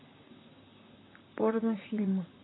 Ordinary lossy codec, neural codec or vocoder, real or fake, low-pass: AAC, 16 kbps; vocoder, 44.1 kHz, 128 mel bands, Pupu-Vocoder; fake; 7.2 kHz